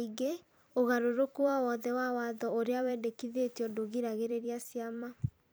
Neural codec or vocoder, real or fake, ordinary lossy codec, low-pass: vocoder, 44.1 kHz, 128 mel bands every 256 samples, BigVGAN v2; fake; none; none